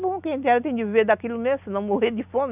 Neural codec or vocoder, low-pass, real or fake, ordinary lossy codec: none; 3.6 kHz; real; none